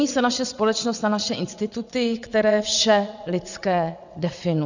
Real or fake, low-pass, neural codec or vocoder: fake; 7.2 kHz; vocoder, 22.05 kHz, 80 mel bands, WaveNeXt